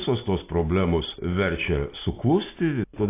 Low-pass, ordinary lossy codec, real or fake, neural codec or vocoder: 3.6 kHz; AAC, 24 kbps; real; none